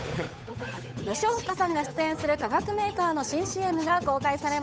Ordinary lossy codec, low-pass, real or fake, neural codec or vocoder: none; none; fake; codec, 16 kHz, 8 kbps, FunCodec, trained on Chinese and English, 25 frames a second